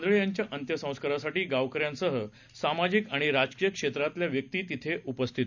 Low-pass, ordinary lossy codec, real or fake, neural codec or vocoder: 7.2 kHz; none; real; none